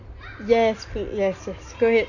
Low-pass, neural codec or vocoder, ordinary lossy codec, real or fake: 7.2 kHz; none; none; real